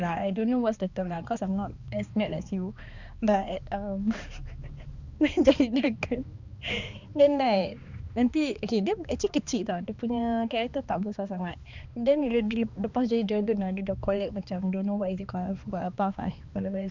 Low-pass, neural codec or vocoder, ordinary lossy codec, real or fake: 7.2 kHz; codec, 16 kHz, 2 kbps, X-Codec, HuBERT features, trained on balanced general audio; Opus, 64 kbps; fake